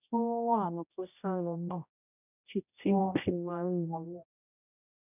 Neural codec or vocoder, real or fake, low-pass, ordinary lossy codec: codec, 16 kHz, 0.5 kbps, X-Codec, HuBERT features, trained on general audio; fake; 3.6 kHz; none